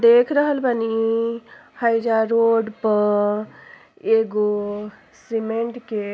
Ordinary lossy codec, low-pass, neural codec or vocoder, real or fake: none; none; none; real